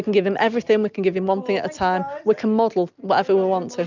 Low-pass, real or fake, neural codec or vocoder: 7.2 kHz; real; none